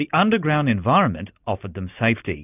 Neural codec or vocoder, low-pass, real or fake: none; 3.6 kHz; real